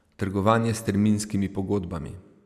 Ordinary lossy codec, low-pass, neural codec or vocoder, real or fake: none; 14.4 kHz; none; real